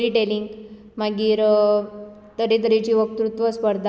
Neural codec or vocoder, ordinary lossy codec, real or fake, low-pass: none; none; real; none